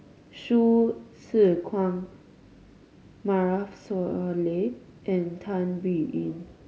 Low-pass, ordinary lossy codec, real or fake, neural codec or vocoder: none; none; real; none